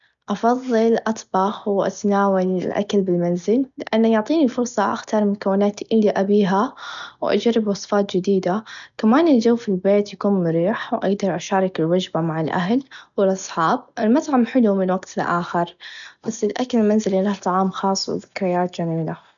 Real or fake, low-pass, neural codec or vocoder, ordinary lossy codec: real; 7.2 kHz; none; none